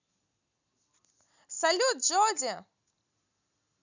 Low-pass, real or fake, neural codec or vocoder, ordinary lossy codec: 7.2 kHz; real; none; none